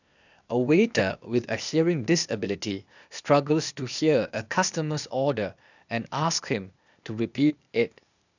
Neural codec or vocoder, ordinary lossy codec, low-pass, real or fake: codec, 16 kHz, 0.8 kbps, ZipCodec; none; 7.2 kHz; fake